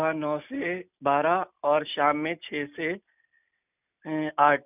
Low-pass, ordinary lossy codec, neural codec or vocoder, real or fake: 3.6 kHz; none; none; real